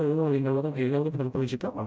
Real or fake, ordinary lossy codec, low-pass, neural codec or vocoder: fake; none; none; codec, 16 kHz, 0.5 kbps, FreqCodec, smaller model